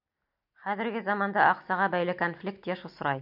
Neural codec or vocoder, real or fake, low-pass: none; real; 5.4 kHz